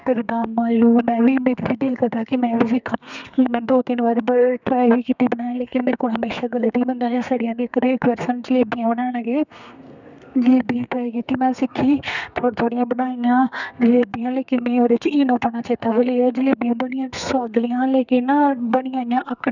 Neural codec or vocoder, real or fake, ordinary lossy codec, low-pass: codec, 32 kHz, 1.9 kbps, SNAC; fake; none; 7.2 kHz